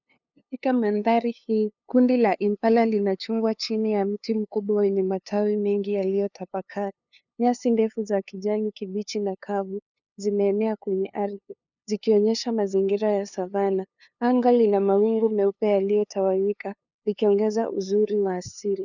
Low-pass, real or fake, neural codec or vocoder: 7.2 kHz; fake; codec, 16 kHz, 2 kbps, FunCodec, trained on LibriTTS, 25 frames a second